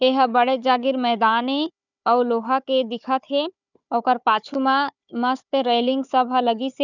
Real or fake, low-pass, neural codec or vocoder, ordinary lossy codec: fake; 7.2 kHz; codec, 16 kHz, 4 kbps, FunCodec, trained on Chinese and English, 50 frames a second; none